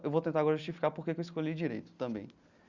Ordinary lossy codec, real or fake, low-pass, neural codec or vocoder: Opus, 64 kbps; real; 7.2 kHz; none